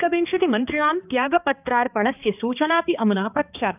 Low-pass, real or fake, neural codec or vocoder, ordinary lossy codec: 3.6 kHz; fake; codec, 16 kHz, 2 kbps, X-Codec, HuBERT features, trained on balanced general audio; none